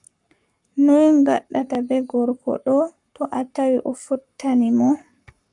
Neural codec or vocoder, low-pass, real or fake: codec, 44.1 kHz, 7.8 kbps, Pupu-Codec; 10.8 kHz; fake